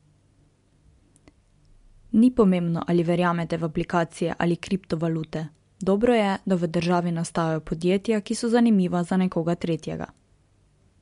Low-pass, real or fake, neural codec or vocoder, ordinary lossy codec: 10.8 kHz; real; none; MP3, 64 kbps